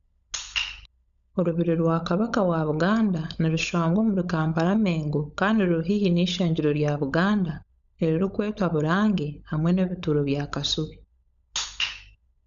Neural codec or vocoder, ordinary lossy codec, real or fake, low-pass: codec, 16 kHz, 16 kbps, FunCodec, trained on LibriTTS, 50 frames a second; none; fake; 7.2 kHz